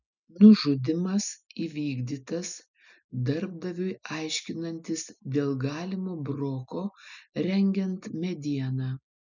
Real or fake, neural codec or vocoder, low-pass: real; none; 7.2 kHz